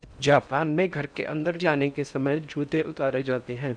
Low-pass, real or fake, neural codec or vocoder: 9.9 kHz; fake; codec, 16 kHz in and 24 kHz out, 0.6 kbps, FocalCodec, streaming, 4096 codes